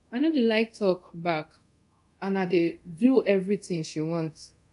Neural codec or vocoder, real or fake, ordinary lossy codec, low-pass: codec, 24 kHz, 0.5 kbps, DualCodec; fake; none; 10.8 kHz